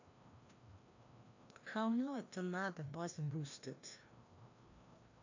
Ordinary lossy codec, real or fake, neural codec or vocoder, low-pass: AAC, 48 kbps; fake; codec, 16 kHz, 1 kbps, FreqCodec, larger model; 7.2 kHz